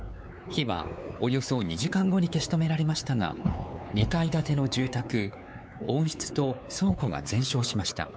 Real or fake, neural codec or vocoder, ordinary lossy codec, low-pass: fake; codec, 16 kHz, 4 kbps, X-Codec, WavLM features, trained on Multilingual LibriSpeech; none; none